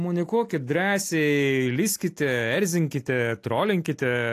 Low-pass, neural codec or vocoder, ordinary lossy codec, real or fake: 14.4 kHz; none; AAC, 64 kbps; real